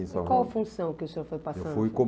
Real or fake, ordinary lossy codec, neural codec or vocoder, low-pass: real; none; none; none